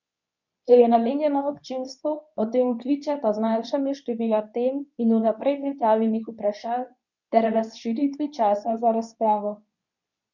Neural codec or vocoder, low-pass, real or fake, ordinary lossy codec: codec, 24 kHz, 0.9 kbps, WavTokenizer, medium speech release version 2; 7.2 kHz; fake; none